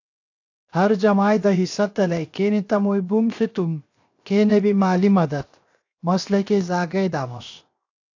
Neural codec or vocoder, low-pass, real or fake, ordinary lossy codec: codec, 16 kHz, 0.7 kbps, FocalCodec; 7.2 kHz; fake; AAC, 48 kbps